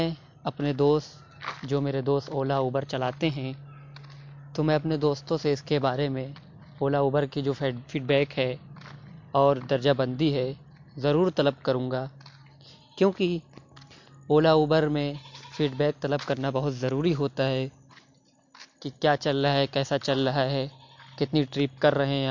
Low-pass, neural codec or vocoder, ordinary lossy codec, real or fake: 7.2 kHz; none; MP3, 48 kbps; real